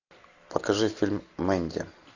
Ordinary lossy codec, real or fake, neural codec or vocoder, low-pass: AAC, 48 kbps; real; none; 7.2 kHz